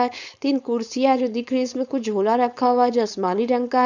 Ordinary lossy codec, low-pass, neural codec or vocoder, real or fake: none; 7.2 kHz; codec, 16 kHz, 4.8 kbps, FACodec; fake